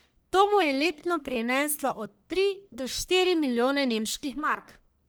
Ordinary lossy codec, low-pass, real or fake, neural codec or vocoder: none; none; fake; codec, 44.1 kHz, 1.7 kbps, Pupu-Codec